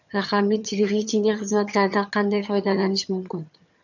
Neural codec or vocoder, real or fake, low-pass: vocoder, 22.05 kHz, 80 mel bands, HiFi-GAN; fake; 7.2 kHz